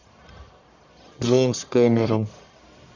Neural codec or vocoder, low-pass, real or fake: codec, 44.1 kHz, 1.7 kbps, Pupu-Codec; 7.2 kHz; fake